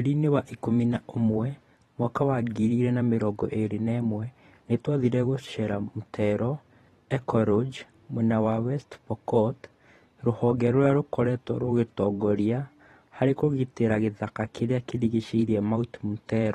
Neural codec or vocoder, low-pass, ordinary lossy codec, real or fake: vocoder, 44.1 kHz, 128 mel bands every 512 samples, BigVGAN v2; 19.8 kHz; AAC, 32 kbps; fake